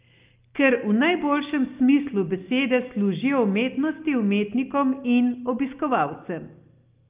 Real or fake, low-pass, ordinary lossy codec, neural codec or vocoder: real; 3.6 kHz; Opus, 24 kbps; none